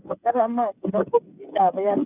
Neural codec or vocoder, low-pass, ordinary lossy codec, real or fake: codec, 16 kHz, 8 kbps, FreqCodec, smaller model; 3.6 kHz; none; fake